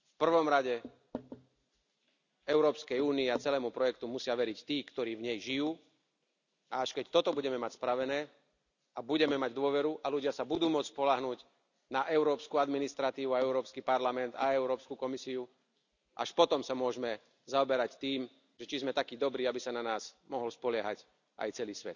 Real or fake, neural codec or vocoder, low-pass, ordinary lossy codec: real; none; 7.2 kHz; none